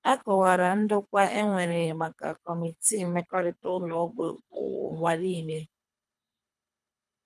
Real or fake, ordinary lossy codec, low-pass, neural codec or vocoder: fake; none; none; codec, 24 kHz, 3 kbps, HILCodec